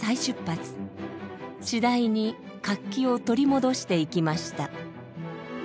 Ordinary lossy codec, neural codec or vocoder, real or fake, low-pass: none; none; real; none